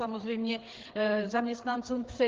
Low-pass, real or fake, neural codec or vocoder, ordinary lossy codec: 7.2 kHz; fake; codec, 16 kHz, 4 kbps, FreqCodec, larger model; Opus, 16 kbps